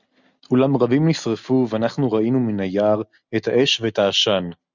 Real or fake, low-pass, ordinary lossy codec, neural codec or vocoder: real; 7.2 kHz; Opus, 64 kbps; none